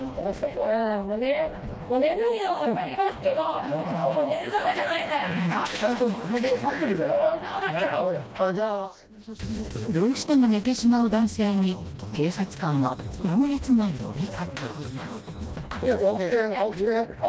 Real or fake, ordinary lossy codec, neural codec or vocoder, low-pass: fake; none; codec, 16 kHz, 1 kbps, FreqCodec, smaller model; none